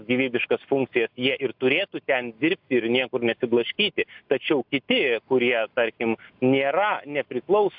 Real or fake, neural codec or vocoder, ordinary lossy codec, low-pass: real; none; AAC, 48 kbps; 5.4 kHz